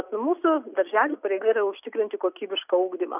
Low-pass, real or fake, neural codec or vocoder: 3.6 kHz; real; none